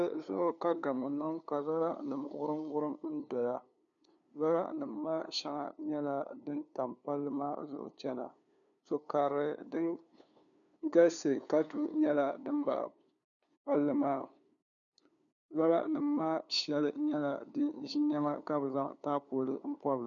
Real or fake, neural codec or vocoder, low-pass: fake; codec, 16 kHz, 2 kbps, FunCodec, trained on LibriTTS, 25 frames a second; 7.2 kHz